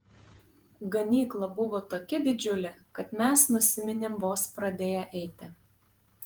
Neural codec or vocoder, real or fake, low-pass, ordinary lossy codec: vocoder, 48 kHz, 128 mel bands, Vocos; fake; 19.8 kHz; Opus, 24 kbps